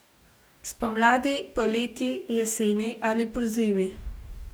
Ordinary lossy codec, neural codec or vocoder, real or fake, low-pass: none; codec, 44.1 kHz, 2.6 kbps, DAC; fake; none